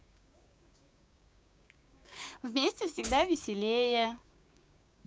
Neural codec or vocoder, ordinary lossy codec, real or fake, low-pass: codec, 16 kHz, 6 kbps, DAC; none; fake; none